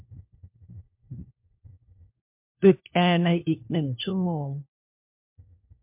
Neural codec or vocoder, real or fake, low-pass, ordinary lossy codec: codec, 16 kHz, 1 kbps, FunCodec, trained on LibriTTS, 50 frames a second; fake; 3.6 kHz; MP3, 32 kbps